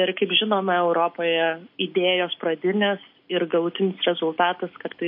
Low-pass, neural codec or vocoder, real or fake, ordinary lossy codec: 5.4 kHz; none; real; MP3, 32 kbps